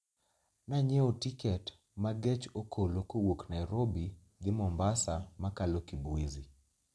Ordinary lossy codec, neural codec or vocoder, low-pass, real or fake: none; none; none; real